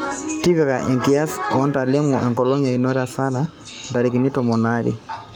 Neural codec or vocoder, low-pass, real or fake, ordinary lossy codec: codec, 44.1 kHz, 7.8 kbps, Pupu-Codec; none; fake; none